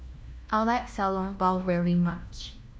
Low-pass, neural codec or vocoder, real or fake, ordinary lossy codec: none; codec, 16 kHz, 1 kbps, FunCodec, trained on LibriTTS, 50 frames a second; fake; none